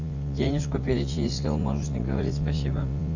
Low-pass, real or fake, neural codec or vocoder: 7.2 kHz; fake; vocoder, 44.1 kHz, 80 mel bands, Vocos